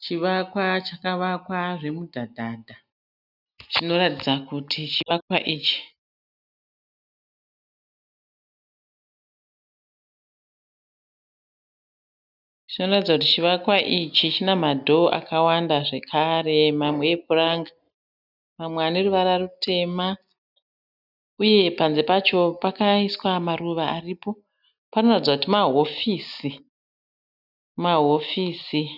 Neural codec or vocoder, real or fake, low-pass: none; real; 5.4 kHz